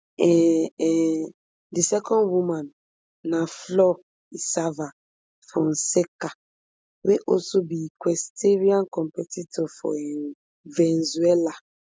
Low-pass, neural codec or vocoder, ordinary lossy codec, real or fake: none; none; none; real